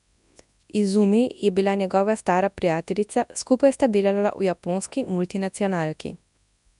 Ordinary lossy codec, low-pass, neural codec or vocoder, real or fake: none; 10.8 kHz; codec, 24 kHz, 0.9 kbps, WavTokenizer, large speech release; fake